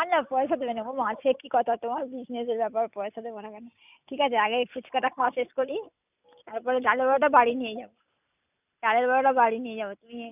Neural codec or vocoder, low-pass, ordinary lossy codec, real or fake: none; 3.6 kHz; none; real